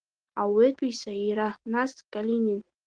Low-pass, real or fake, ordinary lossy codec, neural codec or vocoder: 9.9 kHz; real; Opus, 16 kbps; none